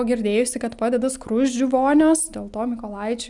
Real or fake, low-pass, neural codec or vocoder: real; 10.8 kHz; none